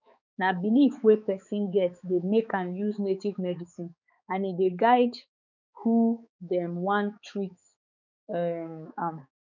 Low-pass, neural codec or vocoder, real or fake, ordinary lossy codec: 7.2 kHz; codec, 16 kHz, 4 kbps, X-Codec, HuBERT features, trained on balanced general audio; fake; none